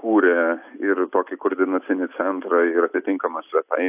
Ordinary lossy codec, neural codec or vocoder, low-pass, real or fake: AAC, 32 kbps; none; 3.6 kHz; real